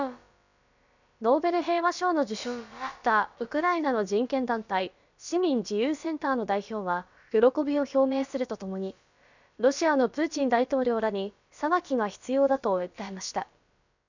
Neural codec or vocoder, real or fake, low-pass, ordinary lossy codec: codec, 16 kHz, about 1 kbps, DyCAST, with the encoder's durations; fake; 7.2 kHz; none